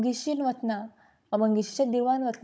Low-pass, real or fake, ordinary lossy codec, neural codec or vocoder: none; fake; none; codec, 16 kHz, 16 kbps, FunCodec, trained on Chinese and English, 50 frames a second